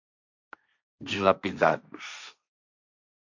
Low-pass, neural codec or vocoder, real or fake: 7.2 kHz; codec, 16 kHz, 1.1 kbps, Voila-Tokenizer; fake